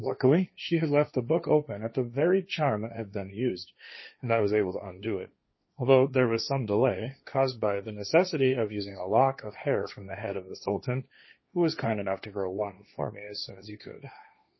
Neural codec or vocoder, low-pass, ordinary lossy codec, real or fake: codec, 16 kHz, 1.1 kbps, Voila-Tokenizer; 7.2 kHz; MP3, 24 kbps; fake